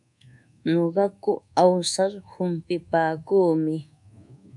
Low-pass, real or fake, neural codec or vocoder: 10.8 kHz; fake; codec, 24 kHz, 1.2 kbps, DualCodec